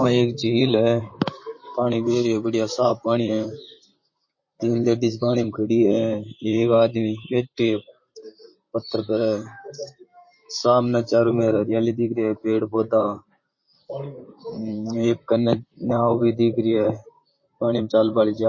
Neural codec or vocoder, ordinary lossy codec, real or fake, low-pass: vocoder, 44.1 kHz, 128 mel bands, Pupu-Vocoder; MP3, 32 kbps; fake; 7.2 kHz